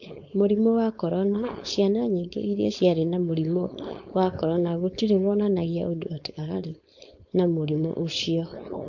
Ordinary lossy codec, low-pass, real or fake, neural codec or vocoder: MP3, 48 kbps; 7.2 kHz; fake; codec, 16 kHz, 4.8 kbps, FACodec